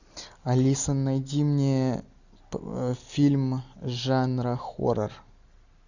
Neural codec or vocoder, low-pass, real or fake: none; 7.2 kHz; real